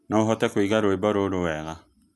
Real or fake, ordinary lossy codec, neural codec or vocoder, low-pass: real; none; none; none